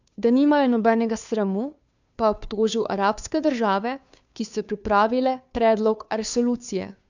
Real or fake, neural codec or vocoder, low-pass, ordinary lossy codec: fake; codec, 16 kHz, 2 kbps, FunCodec, trained on Chinese and English, 25 frames a second; 7.2 kHz; none